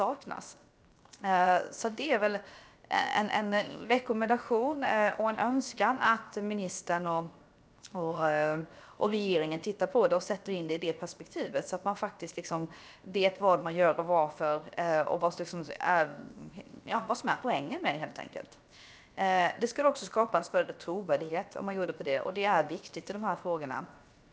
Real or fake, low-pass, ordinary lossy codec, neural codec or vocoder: fake; none; none; codec, 16 kHz, 0.7 kbps, FocalCodec